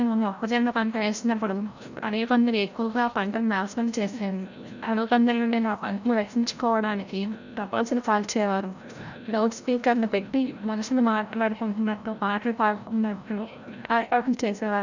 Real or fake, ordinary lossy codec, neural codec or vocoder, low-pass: fake; none; codec, 16 kHz, 0.5 kbps, FreqCodec, larger model; 7.2 kHz